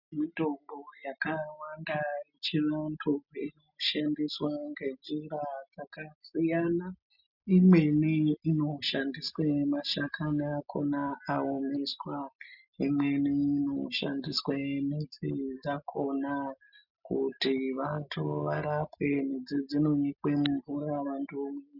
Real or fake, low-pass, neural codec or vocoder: real; 5.4 kHz; none